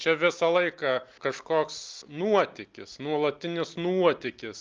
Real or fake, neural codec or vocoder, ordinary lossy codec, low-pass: real; none; Opus, 24 kbps; 7.2 kHz